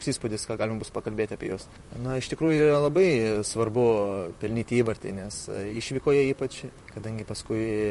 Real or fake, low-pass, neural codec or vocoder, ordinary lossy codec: fake; 14.4 kHz; vocoder, 44.1 kHz, 128 mel bands, Pupu-Vocoder; MP3, 48 kbps